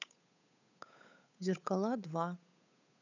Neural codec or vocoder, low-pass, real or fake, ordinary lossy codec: codec, 16 kHz, 8 kbps, FunCodec, trained on Chinese and English, 25 frames a second; 7.2 kHz; fake; none